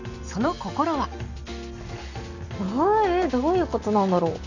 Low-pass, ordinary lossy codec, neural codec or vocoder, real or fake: 7.2 kHz; none; none; real